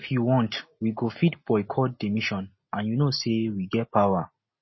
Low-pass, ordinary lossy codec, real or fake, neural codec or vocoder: 7.2 kHz; MP3, 24 kbps; real; none